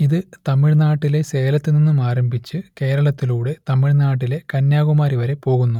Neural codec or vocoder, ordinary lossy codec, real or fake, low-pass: none; none; real; 19.8 kHz